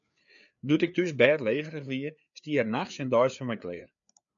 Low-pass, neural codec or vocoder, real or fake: 7.2 kHz; codec, 16 kHz, 4 kbps, FreqCodec, larger model; fake